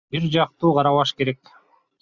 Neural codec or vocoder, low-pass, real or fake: none; 7.2 kHz; real